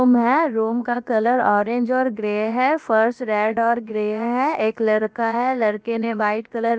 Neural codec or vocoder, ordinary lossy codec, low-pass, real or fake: codec, 16 kHz, about 1 kbps, DyCAST, with the encoder's durations; none; none; fake